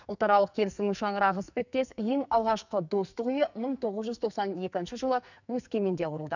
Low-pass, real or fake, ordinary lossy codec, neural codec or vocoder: 7.2 kHz; fake; none; codec, 44.1 kHz, 2.6 kbps, SNAC